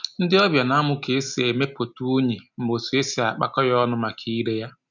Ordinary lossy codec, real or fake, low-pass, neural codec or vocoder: none; real; 7.2 kHz; none